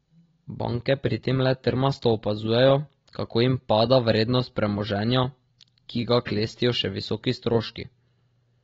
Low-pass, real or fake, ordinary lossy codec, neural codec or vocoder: 7.2 kHz; real; AAC, 24 kbps; none